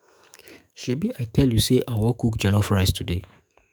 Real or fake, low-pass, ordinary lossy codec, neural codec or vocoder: fake; none; none; autoencoder, 48 kHz, 128 numbers a frame, DAC-VAE, trained on Japanese speech